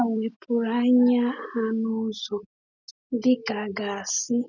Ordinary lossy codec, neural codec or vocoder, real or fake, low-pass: none; none; real; 7.2 kHz